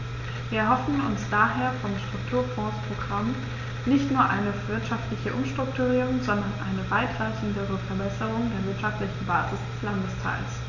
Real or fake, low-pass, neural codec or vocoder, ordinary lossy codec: real; 7.2 kHz; none; none